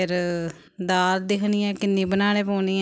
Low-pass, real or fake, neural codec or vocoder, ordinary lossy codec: none; real; none; none